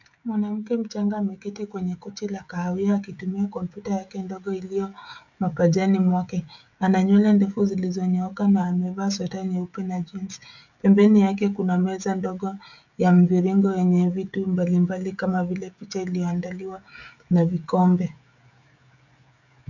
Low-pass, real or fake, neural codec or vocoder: 7.2 kHz; fake; codec, 16 kHz, 16 kbps, FreqCodec, smaller model